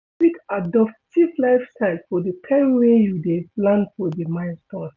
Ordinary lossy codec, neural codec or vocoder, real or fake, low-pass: none; none; real; 7.2 kHz